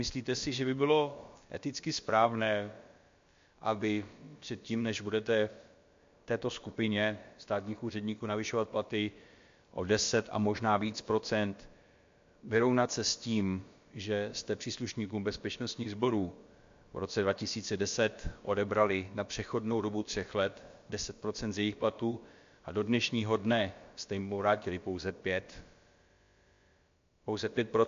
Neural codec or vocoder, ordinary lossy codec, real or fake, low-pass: codec, 16 kHz, about 1 kbps, DyCAST, with the encoder's durations; MP3, 48 kbps; fake; 7.2 kHz